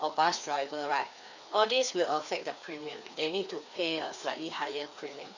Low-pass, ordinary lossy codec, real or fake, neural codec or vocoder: 7.2 kHz; none; fake; codec, 16 kHz, 2 kbps, FreqCodec, larger model